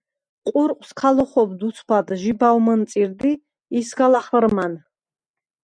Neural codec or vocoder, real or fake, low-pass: none; real; 9.9 kHz